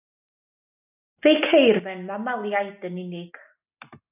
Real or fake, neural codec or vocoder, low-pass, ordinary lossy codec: real; none; 3.6 kHz; AAC, 32 kbps